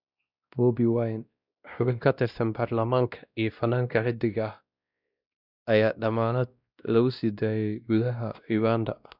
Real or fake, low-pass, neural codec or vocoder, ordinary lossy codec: fake; 5.4 kHz; codec, 16 kHz, 1 kbps, X-Codec, WavLM features, trained on Multilingual LibriSpeech; none